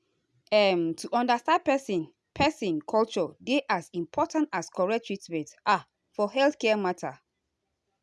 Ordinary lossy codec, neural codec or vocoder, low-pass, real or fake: none; none; none; real